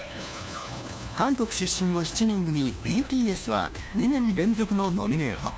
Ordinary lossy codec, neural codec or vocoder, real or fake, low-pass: none; codec, 16 kHz, 1 kbps, FunCodec, trained on LibriTTS, 50 frames a second; fake; none